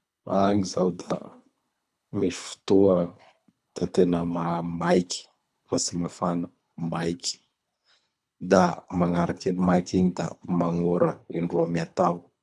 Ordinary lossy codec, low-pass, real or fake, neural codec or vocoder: none; none; fake; codec, 24 kHz, 3 kbps, HILCodec